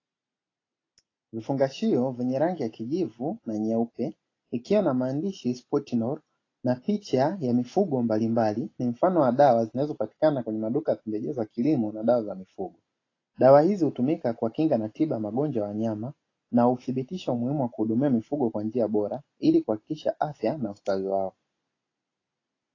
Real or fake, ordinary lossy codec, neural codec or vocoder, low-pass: real; AAC, 32 kbps; none; 7.2 kHz